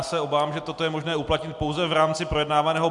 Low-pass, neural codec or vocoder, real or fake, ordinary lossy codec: 10.8 kHz; none; real; MP3, 64 kbps